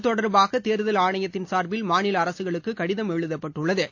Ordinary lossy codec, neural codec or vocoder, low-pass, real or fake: AAC, 48 kbps; none; 7.2 kHz; real